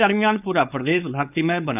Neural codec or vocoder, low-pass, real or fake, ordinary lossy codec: codec, 16 kHz, 4.8 kbps, FACodec; 3.6 kHz; fake; none